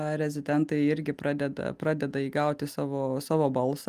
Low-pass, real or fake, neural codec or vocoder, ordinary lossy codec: 14.4 kHz; real; none; Opus, 32 kbps